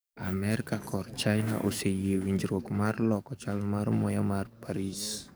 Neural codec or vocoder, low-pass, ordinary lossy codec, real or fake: codec, 44.1 kHz, 7.8 kbps, DAC; none; none; fake